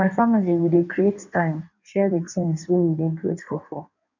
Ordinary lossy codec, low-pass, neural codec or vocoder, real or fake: none; 7.2 kHz; codec, 16 kHz in and 24 kHz out, 1.1 kbps, FireRedTTS-2 codec; fake